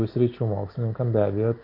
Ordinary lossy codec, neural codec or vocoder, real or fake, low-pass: MP3, 48 kbps; none; real; 5.4 kHz